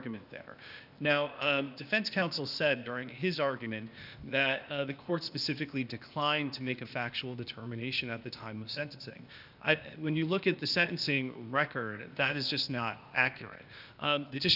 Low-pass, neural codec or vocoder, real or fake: 5.4 kHz; codec, 16 kHz, 0.8 kbps, ZipCodec; fake